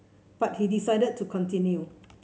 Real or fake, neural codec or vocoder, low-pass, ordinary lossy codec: real; none; none; none